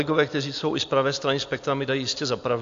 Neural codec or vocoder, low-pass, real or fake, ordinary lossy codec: none; 7.2 kHz; real; AAC, 64 kbps